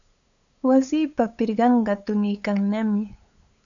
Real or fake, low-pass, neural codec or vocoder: fake; 7.2 kHz; codec, 16 kHz, 8 kbps, FunCodec, trained on LibriTTS, 25 frames a second